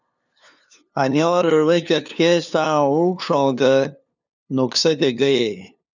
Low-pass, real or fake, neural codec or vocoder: 7.2 kHz; fake; codec, 16 kHz, 2 kbps, FunCodec, trained on LibriTTS, 25 frames a second